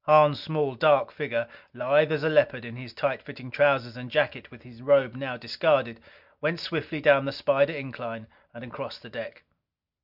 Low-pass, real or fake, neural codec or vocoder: 5.4 kHz; real; none